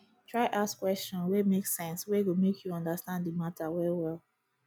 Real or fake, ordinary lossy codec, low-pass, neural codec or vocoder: real; none; none; none